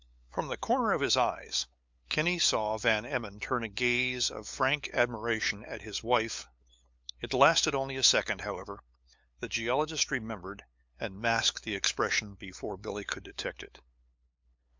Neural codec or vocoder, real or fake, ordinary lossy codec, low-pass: codec, 16 kHz, 8 kbps, FunCodec, trained on LibriTTS, 25 frames a second; fake; MP3, 64 kbps; 7.2 kHz